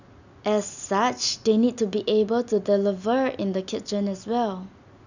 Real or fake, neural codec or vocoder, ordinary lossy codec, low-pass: real; none; none; 7.2 kHz